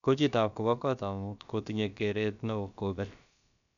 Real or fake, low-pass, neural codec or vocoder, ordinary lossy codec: fake; 7.2 kHz; codec, 16 kHz, about 1 kbps, DyCAST, with the encoder's durations; none